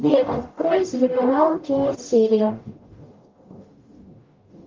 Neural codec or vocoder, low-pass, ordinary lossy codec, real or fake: codec, 44.1 kHz, 0.9 kbps, DAC; 7.2 kHz; Opus, 24 kbps; fake